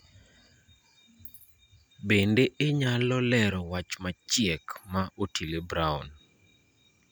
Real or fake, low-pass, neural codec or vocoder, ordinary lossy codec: real; none; none; none